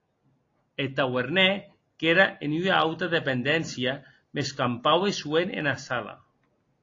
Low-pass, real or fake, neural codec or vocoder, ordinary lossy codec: 7.2 kHz; real; none; AAC, 32 kbps